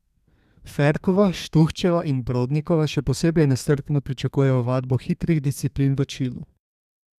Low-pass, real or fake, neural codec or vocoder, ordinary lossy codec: 14.4 kHz; fake; codec, 32 kHz, 1.9 kbps, SNAC; none